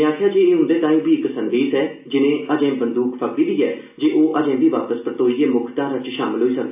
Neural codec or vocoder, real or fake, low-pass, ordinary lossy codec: none; real; 3.6 kHz; AAC, 32 kbps